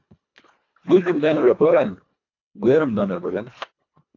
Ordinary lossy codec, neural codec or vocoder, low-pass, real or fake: AAC, 48 kbps; codec, 24 kHz, 1.5 kbps, HILCodec; 7.2 kHz; fake